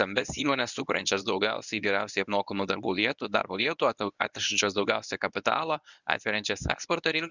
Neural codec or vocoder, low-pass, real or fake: codec, 24 kHz, 0.9 kbps, WavTokenizer, medium speech release version 1; 7.2 kHz; fake